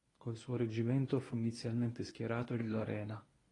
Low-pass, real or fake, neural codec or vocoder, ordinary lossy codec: 10.8 kHz; fake; codec, 24 kHz, 0.9 kbps, WavTokenizer, medium speech release version 1; AAC, 32 kbps